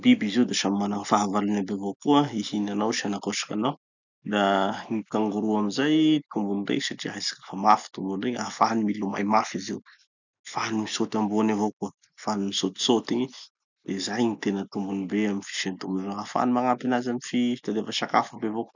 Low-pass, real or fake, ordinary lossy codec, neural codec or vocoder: 7.2 kHz; real; none; none